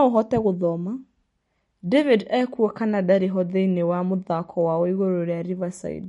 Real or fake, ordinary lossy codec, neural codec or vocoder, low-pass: real; MP3, 48 kbps; none; 19.8 kHz